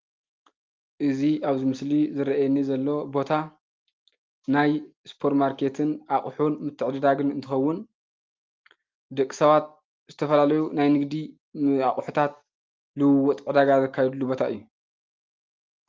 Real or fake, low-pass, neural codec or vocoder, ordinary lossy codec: real; 7.2 kHz; none; Opus, 32 kbps